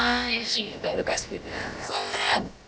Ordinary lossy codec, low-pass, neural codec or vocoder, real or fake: none; none; codec, 16 kHz, about 1 kbps, DyCAST, with the encoder's durations; fake